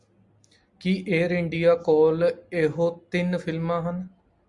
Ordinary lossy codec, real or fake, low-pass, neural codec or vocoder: Opus, 64 kbps; real; 10.8 kHz; none